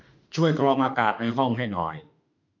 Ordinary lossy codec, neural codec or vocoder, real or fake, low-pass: MP3, 64 kbps; autoencoder, 48 kHz, 32 numbers a frame, DAC-VAE, trained on Japanese speech; fake; 7.2 kHz